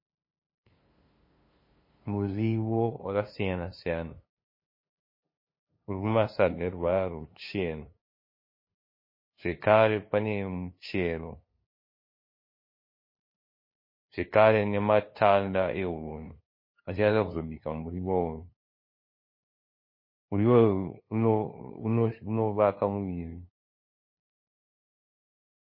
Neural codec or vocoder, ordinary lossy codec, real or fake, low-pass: codec, 16 kHz, 2 kbps, FunCodec, trained on LibriTTS, 25 frames a second; MP3, 24 kbps; fake; 5.4 kHz